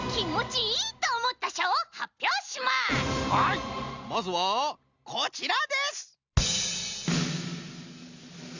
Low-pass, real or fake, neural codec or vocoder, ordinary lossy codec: 7.2 kHz; real; none; Opus, 64 kbps